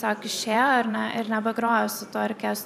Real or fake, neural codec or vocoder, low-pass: fake; vocoder, 44.1 kHz, 128 mel bands every 512 samples, BigVGAN v2; 14.4 kHz